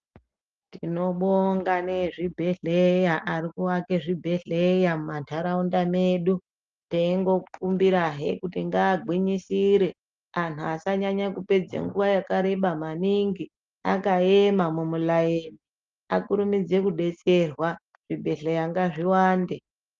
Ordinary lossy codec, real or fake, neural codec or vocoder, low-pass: Opus, 32 kbps; real; none; 7.2 kHz